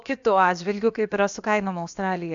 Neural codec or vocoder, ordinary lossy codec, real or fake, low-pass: codec, 16 kHz, about 1 kbps, DyCAST, with the encoder's durations; Opus, 64 kbps; fake; 7.2 kHz